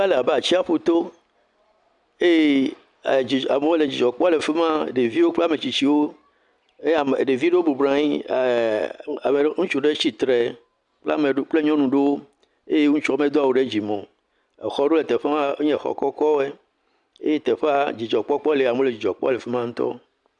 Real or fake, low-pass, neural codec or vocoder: real; 10.8 kHz; none